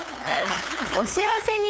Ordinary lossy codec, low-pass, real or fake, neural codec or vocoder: none; none; fake; codec, 16 kHz, 8 kbps, FunCodec, trained on LibriTTS, 25 frames a second